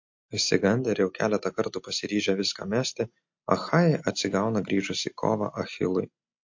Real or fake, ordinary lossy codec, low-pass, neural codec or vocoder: real; MP3, 48 kbps; 7.2 kHz; none